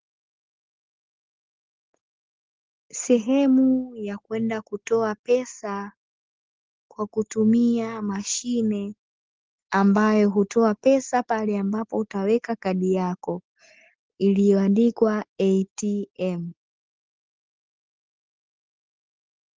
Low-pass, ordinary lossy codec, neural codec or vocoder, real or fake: 7.2 kHz; Opus, 16 kbps; none; real